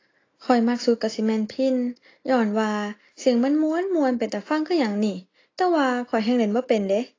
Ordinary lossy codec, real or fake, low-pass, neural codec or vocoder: AAC, 32 kbps; real; 7.2 kHz; none